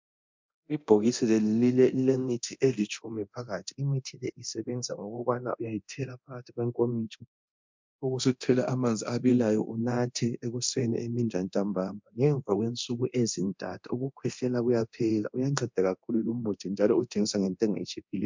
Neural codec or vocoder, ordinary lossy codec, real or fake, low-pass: codec, 24 kHz, 0.9 kbps, DualCodec; MP3, 64 kbps; fake; 7.2 kHz